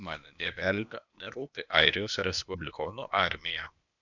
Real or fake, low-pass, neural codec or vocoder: fake; 7.2 kHz; codec, 16 kHz, 0.8 kbps, ZipCodec